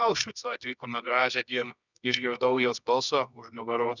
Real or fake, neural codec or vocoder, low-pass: fake; codec, 24 kHz, 0.9 kbps, WavTokenizer, medium music audio release; 7.2 kHz